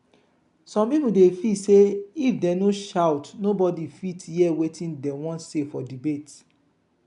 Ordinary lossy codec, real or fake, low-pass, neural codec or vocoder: none; real; 10.8 kHz; none